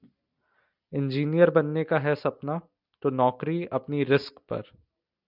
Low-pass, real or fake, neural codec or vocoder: 5.4 kHz; real; none